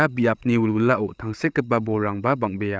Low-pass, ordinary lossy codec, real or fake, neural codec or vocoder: none; none; fake; codec, 16 kHz, 8 kbps, FreqCodec, larger model